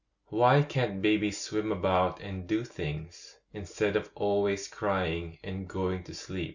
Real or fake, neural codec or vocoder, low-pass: real; none; 7.2 kHz